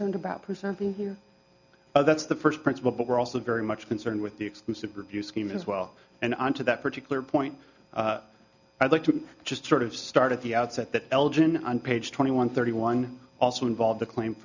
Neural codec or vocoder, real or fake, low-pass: none; real; 7.2 kHz